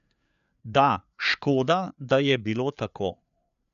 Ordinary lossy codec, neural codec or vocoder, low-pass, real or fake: none; codec, 16 kHz, 4 kbps, FreqCodec, larger model; 7.2 kHz; fake